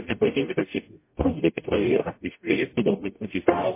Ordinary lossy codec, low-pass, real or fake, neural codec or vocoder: MP3, 24 kbps; 3.6 kHz; fake; codec, 44.1 kHz, 0.9 kbps, DAC